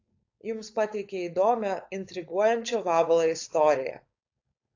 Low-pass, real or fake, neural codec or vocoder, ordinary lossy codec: 7.2 kHz; fake; codec, 16 kHz, 4.8 kbps, FACodec; AAC, 48 kbps